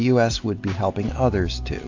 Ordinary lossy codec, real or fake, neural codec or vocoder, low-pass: AAC, 48 kbps; real; none; 7.2 kHz